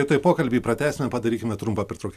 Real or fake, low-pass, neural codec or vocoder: real; 14.4 kHz; none